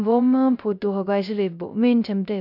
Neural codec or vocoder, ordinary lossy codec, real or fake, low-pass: codec, 16 kHz, 0.3 kbps, FocalCodec; none; fake; 5.4 kHz